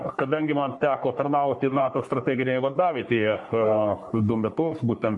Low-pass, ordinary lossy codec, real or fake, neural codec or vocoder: 10.8 kHz; MP3, 48 kbps; fake; codec, 44.1 kHz, 3.4 kbps, Pupu-Codec